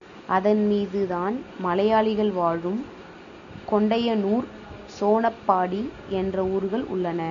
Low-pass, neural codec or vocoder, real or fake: 7.2 kHz; none; real